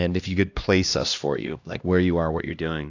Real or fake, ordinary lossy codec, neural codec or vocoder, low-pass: fake; AAC, 48 kbps; codec, 16 kHz, 1 kbps, X-Codec, HuBERT features, trained on LibriSpeech; 7.2 kHz